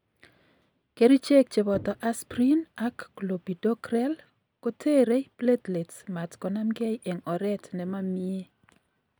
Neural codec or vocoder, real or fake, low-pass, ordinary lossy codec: none; real; none; none